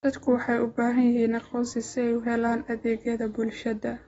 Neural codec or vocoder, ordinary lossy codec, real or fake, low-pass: none; AAC, 24 kbps; real; 19.8 kHz